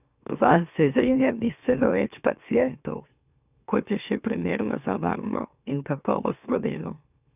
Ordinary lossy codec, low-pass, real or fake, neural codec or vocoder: none; 3.6 kHz; fake; autoencoder, 44.1 kHz, a latent of 192 numbers a frame, MeloTTS